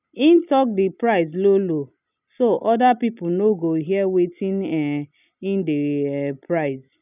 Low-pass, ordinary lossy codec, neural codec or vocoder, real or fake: 3.6 kHz; none; none; real